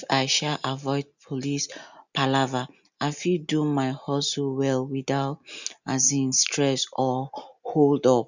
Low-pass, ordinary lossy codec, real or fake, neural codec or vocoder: 7.2 kHz; none; real; none